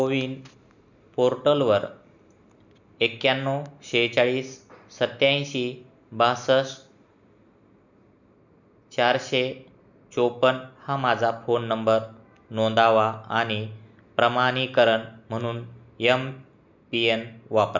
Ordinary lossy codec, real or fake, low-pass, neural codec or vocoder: none; real; 7.2 kHz; none